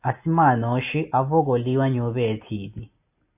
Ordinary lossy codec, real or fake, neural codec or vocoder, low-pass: AAC, 32 kbps; real; none; 3.6 kHz